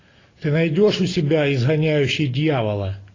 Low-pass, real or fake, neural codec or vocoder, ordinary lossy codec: 7.2 kHz; real; none; AAC, 32 kbps